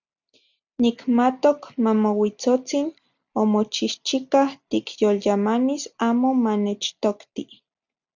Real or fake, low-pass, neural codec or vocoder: real; 7.2 kHz; none